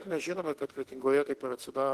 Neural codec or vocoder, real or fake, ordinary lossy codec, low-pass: autoencoder, 48 kHz, 32 numbers a frame, DAC-VAE, trained on Japanese speech; fake; Opus, 16 kbps; 14.4 kHz